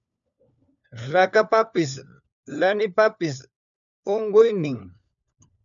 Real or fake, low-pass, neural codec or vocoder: fake; 7.2 kHz; codec, 16 kHz, 4 kbps, FunCodec, trained on LibriTTS, 50 frames a second